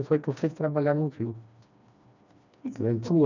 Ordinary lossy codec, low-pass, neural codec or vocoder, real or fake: none; 7.2 kHz; codec, 16 kHz, 2 kbps, FreqCodec, smaller model; fake